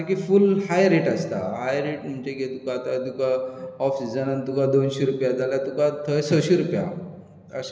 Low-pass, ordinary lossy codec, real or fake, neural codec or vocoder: none; none; real; none